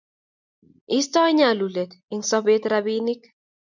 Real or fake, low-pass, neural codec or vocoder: real; 7.2 kHz; none